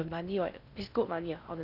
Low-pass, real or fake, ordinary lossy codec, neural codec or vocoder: 5.4 kHz; fake; none; codec, 16 kHz in and 24 kHz out, 0.6 kbps, FocalCodec, streaming, 4096 codes